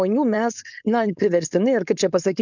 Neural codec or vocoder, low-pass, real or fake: codec, 16 kHz, 4.8 kbps, FACodec; 7.2 kHz; fake